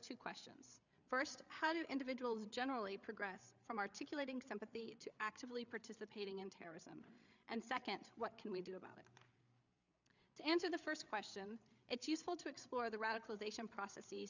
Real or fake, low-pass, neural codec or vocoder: fake; 7.2 kHz; codec, 16 kHz, 8 kbps, FreqCodec, larger model